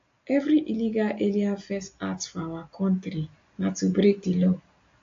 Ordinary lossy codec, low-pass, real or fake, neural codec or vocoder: AAC, 48 kbps; 7.2 kHz; real; none